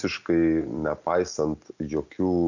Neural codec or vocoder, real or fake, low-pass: none; real; 7.2 kHz